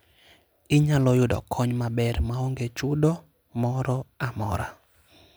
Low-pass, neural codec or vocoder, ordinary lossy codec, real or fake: none; none; none; real